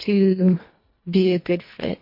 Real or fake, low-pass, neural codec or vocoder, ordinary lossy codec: fake; 5.4 kHz; codec, 24 kHz, 1.5 kbps, HILCodec; MP3, 32 kbps